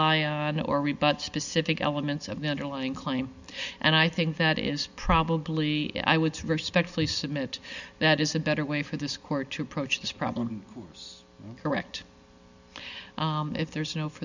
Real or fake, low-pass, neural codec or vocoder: real; 7.2 kHz; none